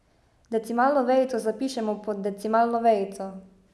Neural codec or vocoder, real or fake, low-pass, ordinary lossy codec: none; real; none; none